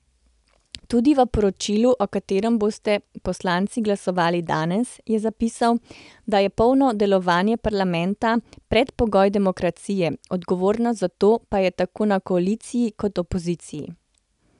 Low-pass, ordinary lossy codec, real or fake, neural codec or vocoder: 10.8 kHz; none; real; none